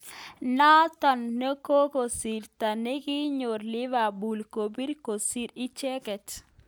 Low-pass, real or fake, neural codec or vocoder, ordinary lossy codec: none; real; none; none